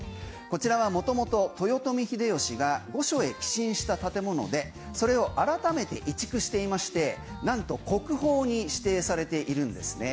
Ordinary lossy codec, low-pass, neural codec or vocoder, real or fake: none; none; none; real